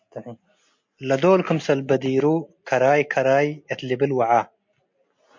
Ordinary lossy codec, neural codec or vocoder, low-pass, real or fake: MP3, 48 kbps; none; 7.2 kHz; real